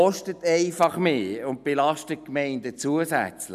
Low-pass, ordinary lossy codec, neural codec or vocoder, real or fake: 14.4 kHz; none; none; real